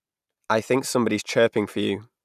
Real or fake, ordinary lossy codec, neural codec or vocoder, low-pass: real; none; none; 14.4 kHz